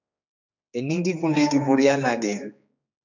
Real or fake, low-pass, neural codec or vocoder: fake; 7.2 kHz; codec, 16 kHz, 2 kbps, X-Codec, HuBERT features, trained on general audio